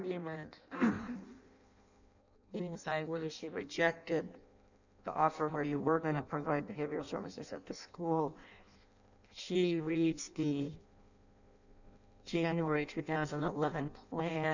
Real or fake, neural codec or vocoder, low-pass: fake; codec, 16 kHz in and 24 kHz out, 0.6 kbps, FireRedTTS-2 codec; 7.2 kHz